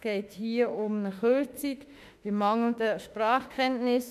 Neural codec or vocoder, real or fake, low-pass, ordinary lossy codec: autoencoder, 48 kHz, 32 numbers a frame, DAC-VAE, trained on Japanese speech; fake; 14.4 kHz; none